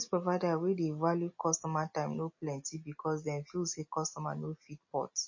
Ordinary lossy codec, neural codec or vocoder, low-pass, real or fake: MP3, 32 kbps; none; 7.2 kHz; real